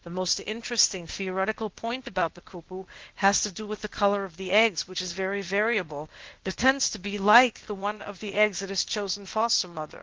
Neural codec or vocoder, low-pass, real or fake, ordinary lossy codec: codec, 16 kHz, 0.8 kbps, ZipCodec; 7.2 kHz; fake; Opus, 16 kbps